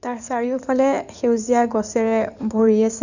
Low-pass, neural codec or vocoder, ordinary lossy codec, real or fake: 7.2 kHz; codec, 16 kHz in and 24 kHz out, 2.2 kbps, FireRedTTS-2 codec; none; fake